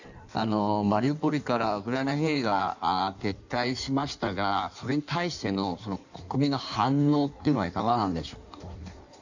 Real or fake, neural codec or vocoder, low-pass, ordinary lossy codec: fake; codec, 16 kHz in and 24 kHz out, 1.1 kbps, FireRedTTS-2 codec; 7.2 kHz; none